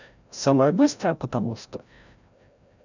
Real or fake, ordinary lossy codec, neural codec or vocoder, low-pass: fake; none; codec, 16 kHz, 0.5 kbps, FreqCodec, larger model; 7.2 kHz